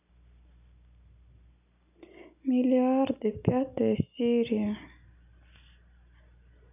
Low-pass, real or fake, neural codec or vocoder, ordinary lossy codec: 3.6 kHz; real; none; none